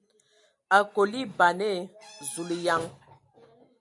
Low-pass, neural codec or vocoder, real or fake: 10.8 kHz; none; real